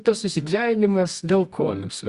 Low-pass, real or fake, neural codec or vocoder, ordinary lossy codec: 10.8 kHz; fake; codec, 24 kHz, 0.9 kbps, WavTokenizer, medium music audio release; Opus, 64 kbps